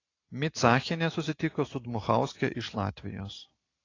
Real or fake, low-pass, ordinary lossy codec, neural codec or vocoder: real; 7.2 kHz; AAC, 32 kbps; none